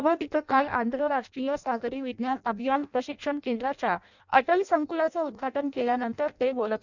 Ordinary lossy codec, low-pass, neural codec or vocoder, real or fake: none; 7.2 kHz; codec, 16 kHz in and 24 kHz out, 0.6 kbps, FireRedTTS-2 codec; fake